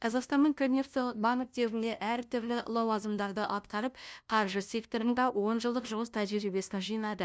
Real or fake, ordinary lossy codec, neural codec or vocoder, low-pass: fake; none; codec, 16 kHz, 0.5 kbps, FunCodec, trained on LibriTTS, 25 frames a second; none